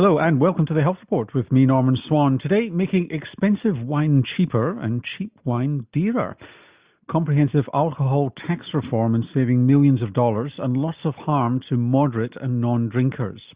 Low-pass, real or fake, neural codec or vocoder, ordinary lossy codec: 3.6 kHz; real; none; Opus, 64 kbps